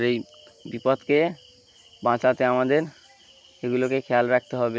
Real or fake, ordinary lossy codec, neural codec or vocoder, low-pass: real; none; none; none